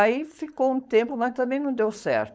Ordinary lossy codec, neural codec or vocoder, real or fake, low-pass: none; codec, 16 kHz, 4.8 kbps, FACodec; fake; none